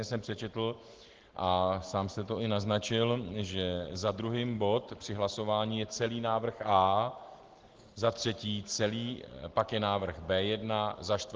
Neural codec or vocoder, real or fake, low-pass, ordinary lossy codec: none; real; 7.2 kHz; Opus, 16 kbps